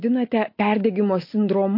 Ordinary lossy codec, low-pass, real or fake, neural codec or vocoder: MP3, 32 kbps; 5.4 kHz; real; none